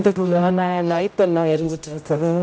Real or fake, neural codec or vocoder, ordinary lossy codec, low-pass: fake; codec, 16 kHz, 0.5 kbps, X-Codec, HuBERT features, trained on general audio; none; none